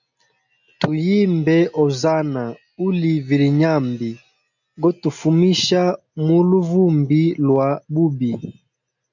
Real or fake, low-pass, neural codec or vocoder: real; 7.2 kHz; none